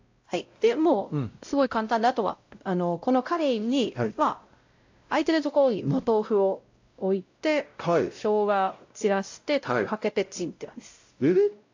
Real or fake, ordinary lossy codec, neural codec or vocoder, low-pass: fake; AAC, 48 kbps; codec, 16 kHz, 0.5 kbps, X-Codec, WavLM features, trained on Multilingual LibriSpeech; 7.2 kHz